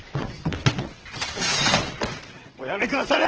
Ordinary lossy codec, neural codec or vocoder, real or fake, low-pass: Opus, 16 kbps; vocoder, 22.05 kHz, 80 mel bands, WaveNeXt; fake; 7.2 kHz